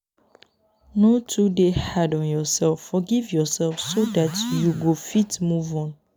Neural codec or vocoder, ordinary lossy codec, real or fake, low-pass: none; none; real; none